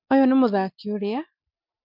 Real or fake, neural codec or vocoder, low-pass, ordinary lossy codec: real; none; 5.4 kHz; MP3, 48 kbps